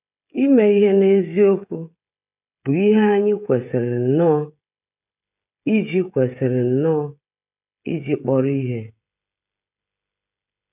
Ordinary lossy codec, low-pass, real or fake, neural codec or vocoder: none; 3.6 kHz; fake; codec, 16 kHz, 16 kbps, FreqCodec, smaller model